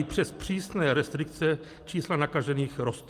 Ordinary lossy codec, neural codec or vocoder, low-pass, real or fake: Opus, 24 kbps; none; 14.4 kHz; real